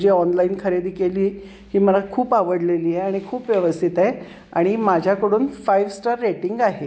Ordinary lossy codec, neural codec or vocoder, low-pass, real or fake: none; none; none; real